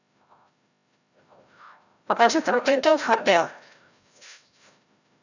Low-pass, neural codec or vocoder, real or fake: 7.2 kHz; codec, 16 kHz, 0.5 kbps, FreqCodec, larger model; fake